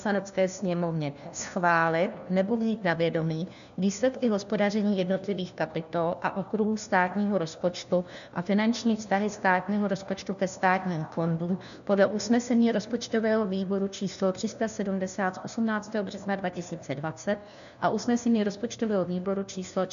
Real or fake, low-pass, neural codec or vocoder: fake; 7.2 kHz; codec, 16 kHz, 1 kbps, FunCodec, trained on LibriTTS, 50 frames a second